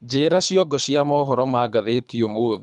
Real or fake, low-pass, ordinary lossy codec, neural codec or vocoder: fake; 10.8 kHz; none; codec, 24 kHz, 3 kbps, HILCodec